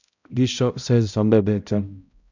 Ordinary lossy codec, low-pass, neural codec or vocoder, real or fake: none; 7.2 kHz; codec, 16 kHz, 0.5 kbps, X-Codec, HuBERT features, trained on balanced general audio; fake